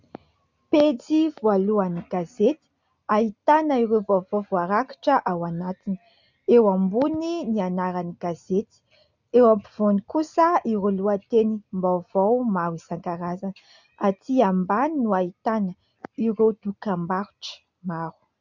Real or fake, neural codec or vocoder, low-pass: real; none; 7.2 kHz